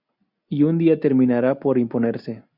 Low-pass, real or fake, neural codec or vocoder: 5.4 kHz; real; none